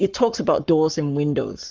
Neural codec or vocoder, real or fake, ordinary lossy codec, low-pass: codec, 44.1 kHz, 7.8 kbps, Pupu-Codec; fake; Opus, 24 kbps; 7.2 kHz